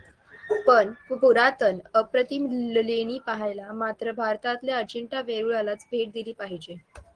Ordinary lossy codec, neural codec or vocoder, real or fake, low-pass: Opus, 16 kbps; none; real; 9.9 kHz